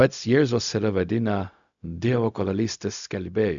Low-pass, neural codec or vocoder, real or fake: 7.2 kHz; codec, 16 kHz, 0.4 kbps, LongCat-Audio-Codec; fake